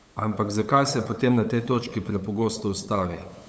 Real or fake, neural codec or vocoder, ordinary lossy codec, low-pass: fake; codec, 16 kHz, 8 kbps, FunCodec, trained on LibriTTS, 25 frames a second; none; none